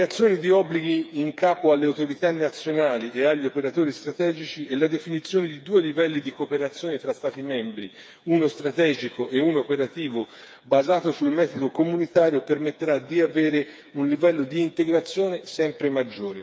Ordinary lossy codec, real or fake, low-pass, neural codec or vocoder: none; fake; none; codec, 16 kHz, 4 kbps, FreqCodec, smaller model